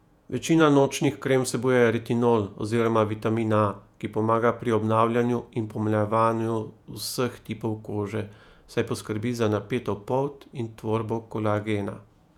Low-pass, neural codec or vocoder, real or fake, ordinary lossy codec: 19.8 kHz; vocoder, 48 kHz, 128 mel bands, Vocos; fake; none